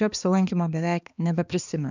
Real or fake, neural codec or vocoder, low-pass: fake; codec, 16 kHz, 2 kbps, X-Codec, HuBERT features, trained on balanced general audio; 7.2 kHz